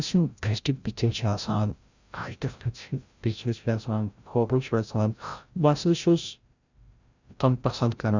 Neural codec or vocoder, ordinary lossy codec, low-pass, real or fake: codec, 16 kHz, 0.5 kbps, FreqCodec, larger model; Opus, 64 kbps; 7.2 kHz; fake